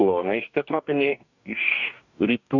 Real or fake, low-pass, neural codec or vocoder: fake; 7.2 kHz; codec, 44.1 kHz, 2.6 kbps, DAC